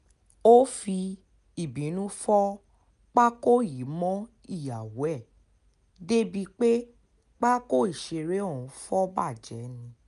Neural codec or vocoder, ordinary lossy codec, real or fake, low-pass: none; none; real; 10.8 kHz